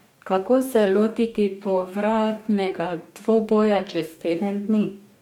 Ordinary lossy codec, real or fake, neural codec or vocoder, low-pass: MP3, 96 kbps; fake; codec, 44.1 kHz, 2.6 kbps, DAC; 19.8 kHz